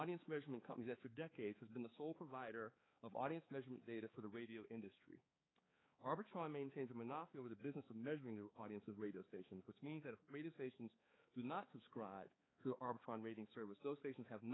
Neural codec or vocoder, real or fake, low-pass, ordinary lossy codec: codec, 16 kHz, 4 kbps, X-Codec, HuBERT features, trained on balanced general audio; fake; 7.2 kHz; AAC, 16 kbps